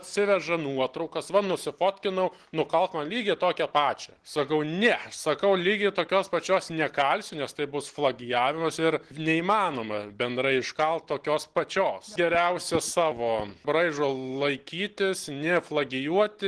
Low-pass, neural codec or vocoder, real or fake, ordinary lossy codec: 10.8 kHz; none; real; Opus, 16 kbps